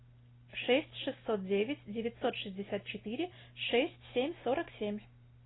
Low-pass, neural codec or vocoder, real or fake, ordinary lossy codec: 7.2 kHz; none; real; AAC, 16 kbps